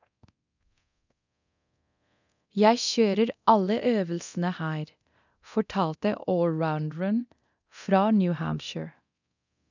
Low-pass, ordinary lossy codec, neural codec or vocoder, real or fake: 7.2 kHz; none; codec, 24 kHz, 0.9 kbps, DualCodec; fake